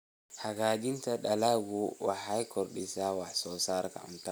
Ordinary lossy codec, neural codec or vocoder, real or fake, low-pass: none; none; real; none